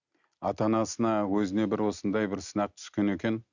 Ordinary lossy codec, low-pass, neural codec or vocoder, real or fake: none; 7.2 kHz; none; real